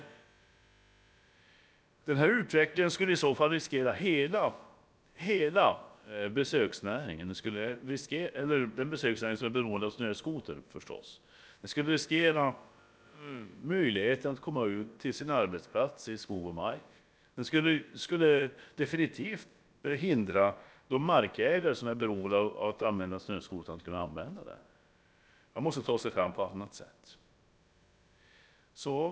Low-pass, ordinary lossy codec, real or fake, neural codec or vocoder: none; none; fake; codec, 16 kHz, about 1 kbps, DyCAST, with the encoder's durations